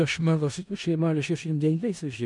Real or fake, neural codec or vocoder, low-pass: fake; codec, 16 kHz in and 24 kHz out, 0.4 kbps, LongCat-Audio-Codec, four codebook decoder; 10.8 kHz